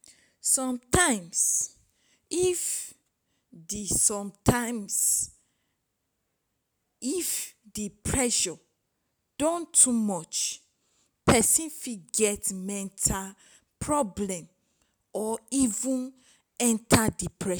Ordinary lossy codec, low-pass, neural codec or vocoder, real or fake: none; none; none; real